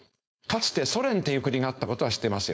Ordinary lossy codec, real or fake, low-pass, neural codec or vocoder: none; fake; none; codec, 16 kHz, 4.8 kbps, FACodec